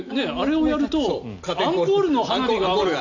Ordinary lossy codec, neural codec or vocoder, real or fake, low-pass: AAC, 48 kbps; none; real; 7.2 kHz